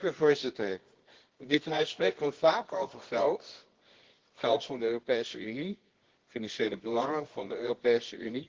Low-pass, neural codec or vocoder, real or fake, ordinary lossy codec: 7.2 kHz; codec, 24 kHz, 0.9 kbps, WavTokenizer, medium music audio release; fake; Opus, 16 kbps